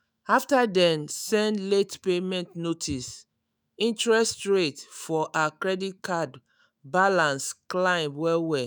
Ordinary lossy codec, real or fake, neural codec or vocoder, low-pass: none; fake; autoencoder, 48 kHz, 128 numbers a frame, DAC-VAE, trained on Japanese speech; none